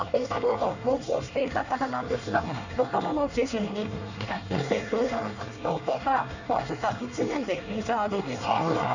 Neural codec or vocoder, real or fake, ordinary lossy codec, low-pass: codec, 24 kHz, 1 kbps, SNAC; fake; none; 7.2 kHz